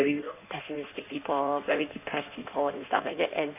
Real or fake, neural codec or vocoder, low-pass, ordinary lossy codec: fake; codec, 16 kHz in and 24 kHz out, 1.1 kbps, FireRedTTS-2 codec; 3.6 kHz; none